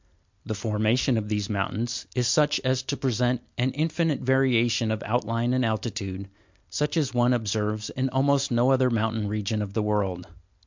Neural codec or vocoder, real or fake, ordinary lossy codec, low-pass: none; real; MP3, 64 kbps; 7.2 kHz